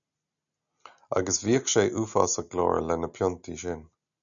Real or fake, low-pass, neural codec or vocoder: real; 7.2 kHz; none